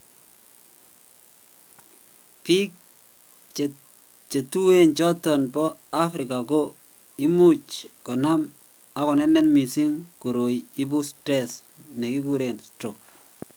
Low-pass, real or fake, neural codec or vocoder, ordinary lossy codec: none; fake; codec, 44.1 kHz, 7.8 kbps, DAC; none